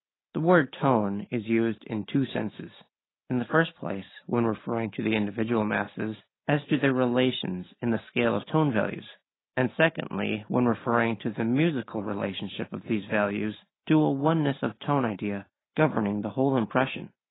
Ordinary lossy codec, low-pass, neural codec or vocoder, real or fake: AAC, 16 kbps; 7.2 kHz; none; real